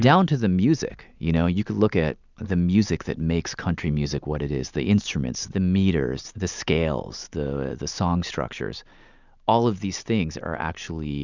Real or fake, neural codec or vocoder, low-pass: real; none; 7.2 kHz